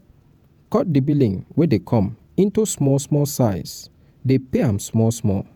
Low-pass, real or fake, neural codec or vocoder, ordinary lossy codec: none; fake; vocoder, 48 kHz, 128 mel bands, Vocos; none